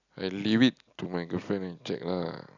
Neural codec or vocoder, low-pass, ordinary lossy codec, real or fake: none; 7.2 kHz; none; real